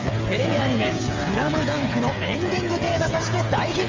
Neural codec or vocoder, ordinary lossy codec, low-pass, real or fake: codec, 16 kHz, 8 kbps, FreqCodec, smaller model; Opus, 32 kbps; 7.2 kHz; fake